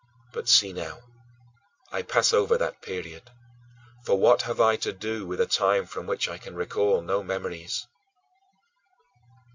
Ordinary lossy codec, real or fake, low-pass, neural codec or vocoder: MP3, 64 kbps; real; 7.2 kHz; none